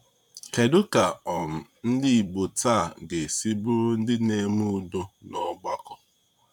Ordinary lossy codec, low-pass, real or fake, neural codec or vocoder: none; 19.8 kHz; fake; vocoder, 44.1 kHz, 128 mel bands, Pupu-Vocoder